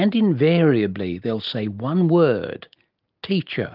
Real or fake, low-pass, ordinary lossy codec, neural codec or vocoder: real; 5.4 kHz; Opus, 32 kbps; none